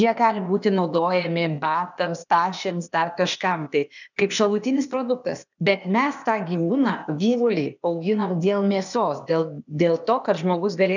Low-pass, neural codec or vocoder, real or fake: 7.2 kHz; codec, 16 kHz, 0.8 kbps, ZipCodec; fake